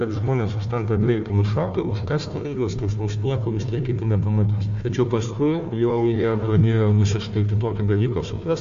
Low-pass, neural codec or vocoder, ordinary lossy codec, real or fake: 7.2 kHz; codec, 16 kHz, 1 kbps, FunCodec, trained on Chinese and English, 50 frames a second; AAC, 64 kbps; fake